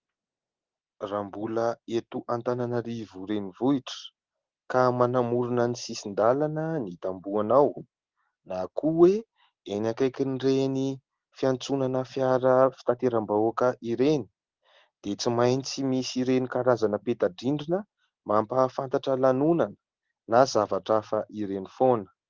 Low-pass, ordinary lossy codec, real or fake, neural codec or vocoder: 7.2 kHz; Opus, 16 kbps; real; none